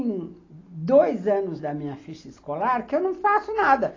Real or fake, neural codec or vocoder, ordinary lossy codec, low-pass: real; none; AAC, 32 kbps; 7.2 kHz